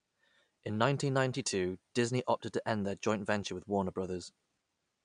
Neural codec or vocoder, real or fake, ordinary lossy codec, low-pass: none; real; none; 9.9 kHz